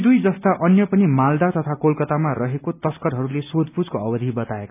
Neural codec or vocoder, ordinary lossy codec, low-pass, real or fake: none; none; 3.6 kHz; real